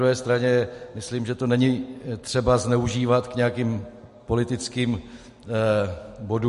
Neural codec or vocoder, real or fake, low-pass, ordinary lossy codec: none; real; 14.4 kHz; MP3, 48 kbps